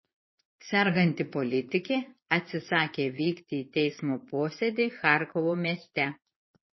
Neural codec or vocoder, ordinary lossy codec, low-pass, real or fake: vocoder, 22.05 kHz, 80 mel bands, Vocos; MP3, 24 kbps; 7.2 kHz; fake